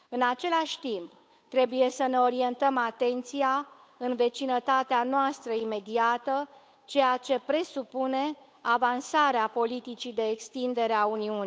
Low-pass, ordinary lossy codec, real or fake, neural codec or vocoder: none; none; fake; codec, 16 kHz, 8 kbps, FunCodec, trained on Chinese and English, 25 frames a second